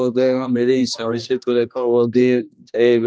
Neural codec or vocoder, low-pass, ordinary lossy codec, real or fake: codec, 16 kHz, 1 kbps, X-Codec, HuBERT features, trained on general audio; none; none; fake